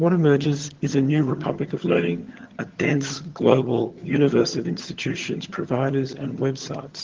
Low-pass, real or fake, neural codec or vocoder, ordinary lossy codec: 7.2 kHz; fake; vocoder, 22.05 kHz, 80 mel bands, HiFi-GAN; Opus, 16 kbps